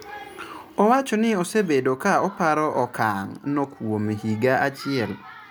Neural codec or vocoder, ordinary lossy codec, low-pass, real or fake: none; none; none; real